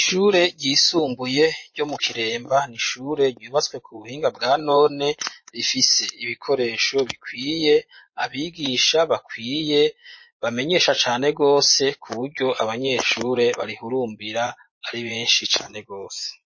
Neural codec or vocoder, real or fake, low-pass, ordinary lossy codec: vocoder, 24 kHz, 100 mel bands, Vocos; fake; 7.2 kHz; MP3, 32 kbps